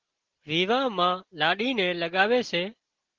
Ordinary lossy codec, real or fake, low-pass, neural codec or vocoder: Opus, 16 kbps; real; 7.2 kHz; none